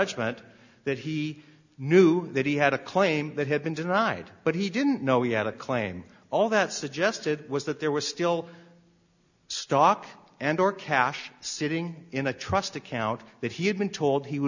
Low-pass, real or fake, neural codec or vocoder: 7.2 kHz; real; none